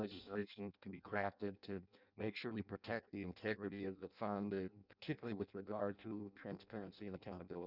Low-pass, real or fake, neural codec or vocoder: 5.4 kHz; fake; codec, 16 kHz in and 24 kHz out, 0.6 kbps, FireRedTTS-2 codec